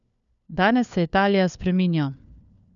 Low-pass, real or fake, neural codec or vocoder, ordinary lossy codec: 7.2 kHz; fake; codec, 16 kHz, 4 kbps, FunCodec, trained on LibriTTS, 50 frames a second; none